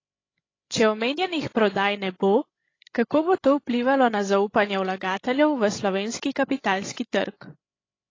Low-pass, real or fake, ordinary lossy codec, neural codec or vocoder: 7.2 kHz; fake; AAC, 32 kbps; codec, 16 kHz, 16 kbps, FreqCodec, larger model